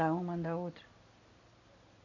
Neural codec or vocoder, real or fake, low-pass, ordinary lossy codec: none; real; 7.2 kHz; none